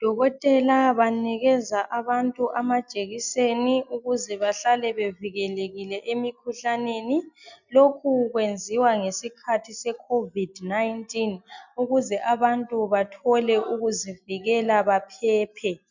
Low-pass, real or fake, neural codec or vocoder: 7.2 kHz; real; none